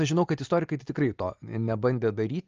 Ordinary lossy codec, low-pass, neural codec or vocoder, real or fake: Opus, 24 kbps; 7.2 kHz; none; real